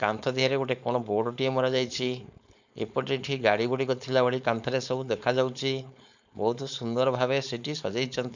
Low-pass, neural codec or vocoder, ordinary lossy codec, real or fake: 7.2 kHz; codec, 16 kHz, 4.8 kbps, FACodec; none; fake